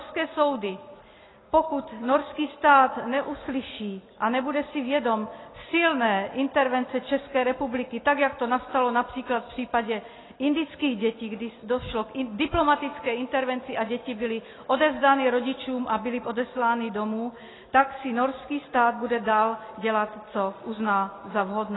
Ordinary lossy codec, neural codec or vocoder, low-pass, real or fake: AAC, 16 kbps; none; 7.2 kHz; real